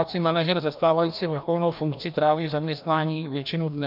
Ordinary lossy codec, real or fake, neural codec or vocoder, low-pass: MP3, 48 kbps; fake; codec, 16 kHz, 1 kbps, FreqCodec, larger model; 5.4 kHz